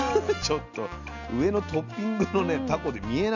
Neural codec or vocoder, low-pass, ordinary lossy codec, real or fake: none; 7.2 kHz; none; real